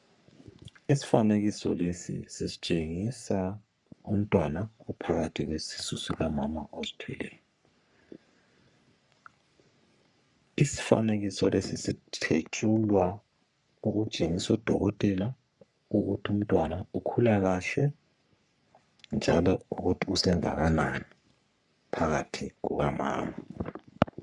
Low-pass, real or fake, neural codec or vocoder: 10.8 kHz; fake; codec, 44.1 kHz, 3.4 kbps, Pupu-Codec